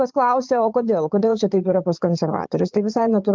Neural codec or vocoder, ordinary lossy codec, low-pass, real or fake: vocoder, 22.05 kHz, 80 mel bands, Vocos; Opus, 24 kbps; 7.2 kHz; fake